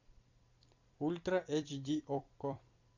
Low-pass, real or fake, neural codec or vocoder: 7.2 kHz; fake; vocoder, 44.1 kHz, 128 mel bands every 256 samples, BigVGAN v2